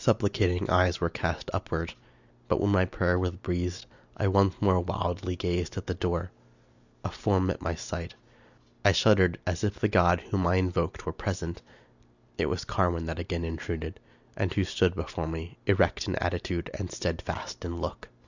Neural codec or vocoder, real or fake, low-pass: none; real; 7.2 kHz